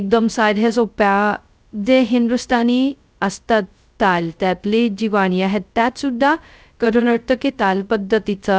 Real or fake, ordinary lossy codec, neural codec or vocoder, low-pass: fake; none; codec, 16 kHz, 0.2 kbps, FocalCodec; none